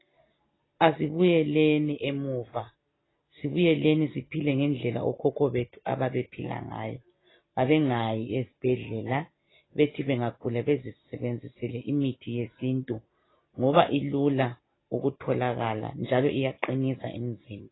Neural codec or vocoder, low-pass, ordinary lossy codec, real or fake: none; 7.2 kHz; AAC, 16 kbps; real